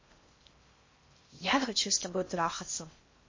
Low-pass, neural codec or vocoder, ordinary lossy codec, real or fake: 7.2 kHz; codec, 16 kHz in and 24 kHz out, 0.6 kbps, FocalCodec, streaming, 4096 codes; MP3, 32 kbps; fake